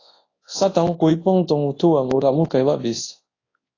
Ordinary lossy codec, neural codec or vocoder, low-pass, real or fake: AAC, 32 kbps; codec, 24 kHz, 0.9 kbps, WavTokenizer, large speech release; 7.2 kHz; fake